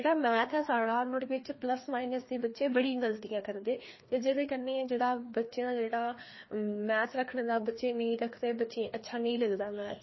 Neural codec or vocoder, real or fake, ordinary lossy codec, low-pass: codec, 16 kHz, 2 kbps, FreqCodec, larger model; fake; MP3, 24 kbps; 7.2 kHz